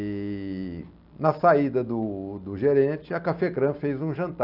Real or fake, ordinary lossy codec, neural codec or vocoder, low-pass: real; none; none; 5.4 kHz